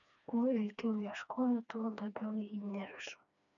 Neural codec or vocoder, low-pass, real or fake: codec, 16 kHz, 2 kbps, FreqCodec, smaller model; 7.2 kHz; fake